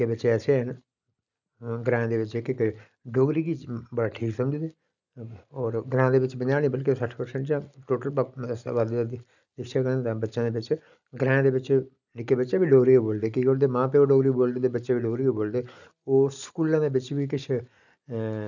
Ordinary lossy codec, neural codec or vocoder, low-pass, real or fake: none; none; 7.2 kHz; real